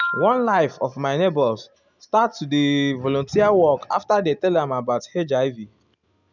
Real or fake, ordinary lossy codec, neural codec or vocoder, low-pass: real; none; none; 7.2 kHz